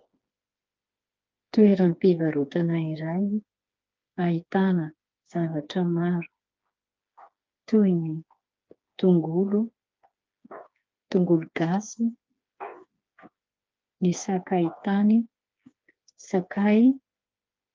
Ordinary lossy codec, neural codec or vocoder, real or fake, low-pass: Opus, 24 kbps; codec, 16 kHz, 4 kbps, FreqCodec, smaller model; fake; 7.2 kHz